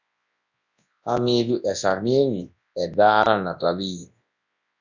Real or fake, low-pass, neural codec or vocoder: fake; 7.2 kHz; codec, 24 kHz, 0.9 kbps, WavTokenizer, large speech release